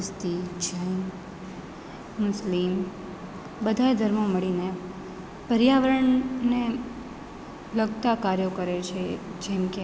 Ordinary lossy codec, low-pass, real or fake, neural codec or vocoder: none; none; real; none